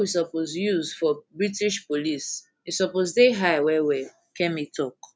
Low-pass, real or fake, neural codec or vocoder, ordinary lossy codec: none; real; none; none